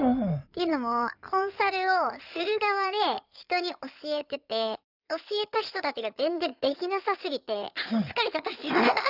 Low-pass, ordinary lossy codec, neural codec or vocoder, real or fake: 5.4 kHz; none; codec, 16 kHz in and 24 kHz out, 2.2 kbps, FireRedTTS-2 codec; fake